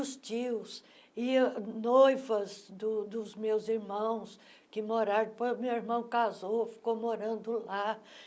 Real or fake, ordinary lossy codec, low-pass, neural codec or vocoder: real; none; none; none